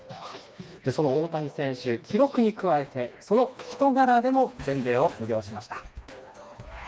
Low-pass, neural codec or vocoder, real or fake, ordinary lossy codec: none; codec, 16 kHz, 2 kbps, FreqCodec, smaller model; fake; none